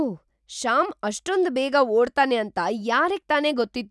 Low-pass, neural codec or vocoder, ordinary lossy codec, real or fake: none; none; none; real